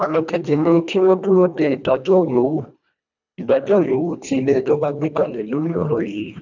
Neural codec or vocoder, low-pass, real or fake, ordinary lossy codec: codec, 24 kHz, 1.5 kbps, HILCodec; 7.2 kHz; fake; none